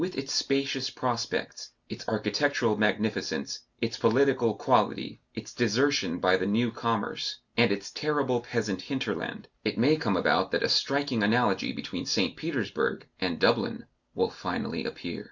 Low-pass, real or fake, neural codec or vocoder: 7.2 kHz; real; none